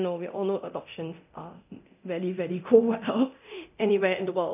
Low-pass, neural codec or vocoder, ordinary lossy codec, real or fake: 3.6 kHz; codec, 24 kHz, 0.9 kbps, DualCodec; none; fake